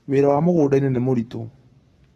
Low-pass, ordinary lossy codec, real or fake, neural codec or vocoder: 19.8 kHz; AAC, 32 kbps; fake; codec, 44.1 kHz, 7.8 kbps, Pupu-Codec